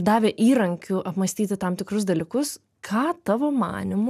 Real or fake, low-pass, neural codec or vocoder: real; 14.4 kHz; none